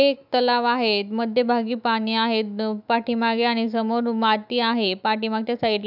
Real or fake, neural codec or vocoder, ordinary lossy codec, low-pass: fake; autoencoder, 48 kHz, 128 numbers a frame, DAC-VAE, trained on Japanese speech; none; 5.4 kHz